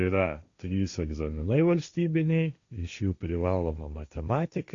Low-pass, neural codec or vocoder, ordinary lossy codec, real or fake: 7.2 kHz; codec, 16 kHz, 1.1 kbps, Voila-Tokenizer; Opus, 64 kbps; fake